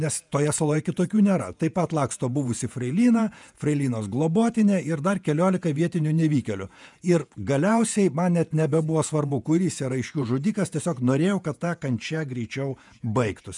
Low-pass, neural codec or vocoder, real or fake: 10.8 kHz; vocoder, 48 kHz, 128 mel bands, Vocos; fake